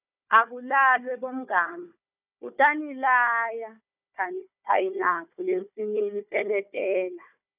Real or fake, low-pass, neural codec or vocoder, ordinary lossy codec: fake; 3.6 kHz; codec, 16 kHz, 4 kbps, FunCodec, trained on Chinese and English, 50 frames a second; none